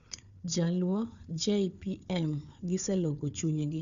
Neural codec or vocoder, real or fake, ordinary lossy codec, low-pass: codec, 16 kHz, 4 kbps, FunCodec, trained on Chinese and English, 50 frames a second; fake; none; 7.2 kHz